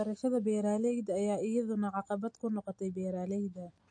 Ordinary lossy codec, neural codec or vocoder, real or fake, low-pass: MP3, 48 kbps; none; real; 9.9 kHz